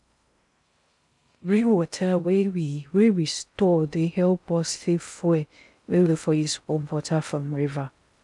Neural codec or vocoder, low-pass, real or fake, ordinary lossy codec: codec, 16 kHz in and 24 kHz out, 0.6 kbps, FocalCodec, streaming, 4096 codes; 10.8 kHz; fake; none